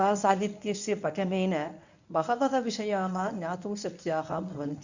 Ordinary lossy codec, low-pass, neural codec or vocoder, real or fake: none; 7.2 kHz; codec, 24 kHz, 0.9 kbps, WavTokenizer, medium speech release version 1; fake